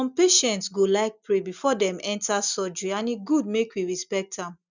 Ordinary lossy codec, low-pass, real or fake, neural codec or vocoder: none; 7.2 kHz; real; none